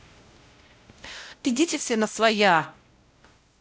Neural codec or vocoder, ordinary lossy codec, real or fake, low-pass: codec, 16 kHz, 0.5 kbps, X-Codec, WavLM features, trained on Multilingual LibriSpeech; none; fake; none